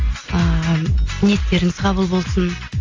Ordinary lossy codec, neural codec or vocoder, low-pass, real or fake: AAC, 32 kbps; none; 7.2 kHz; real